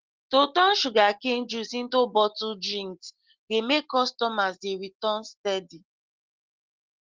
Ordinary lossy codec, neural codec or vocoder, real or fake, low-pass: Opus, 16 kbps; none; real; 7.2 kHz